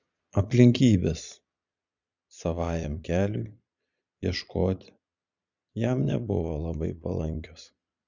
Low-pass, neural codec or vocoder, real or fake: 7.2 kHz; vocoder, 22.05 kHz, 80 mel bands, Vocos; fake